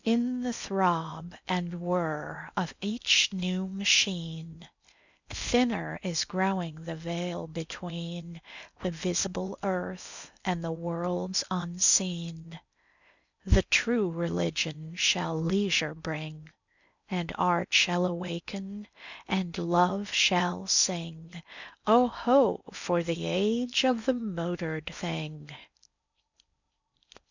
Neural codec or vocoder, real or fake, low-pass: codec, 16 kHz in and 24 kHz out, 0.8 kbps, FocalCodec, streaming, 65536 codes; fake; 7.2 kHz